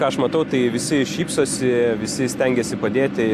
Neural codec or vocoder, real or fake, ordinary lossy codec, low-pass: none; real; AAC, 64 kbps; 14.4 kHz